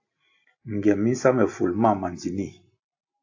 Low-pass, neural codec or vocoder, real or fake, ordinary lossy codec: 7.2 kHz; none; real; AAC, 48 kbps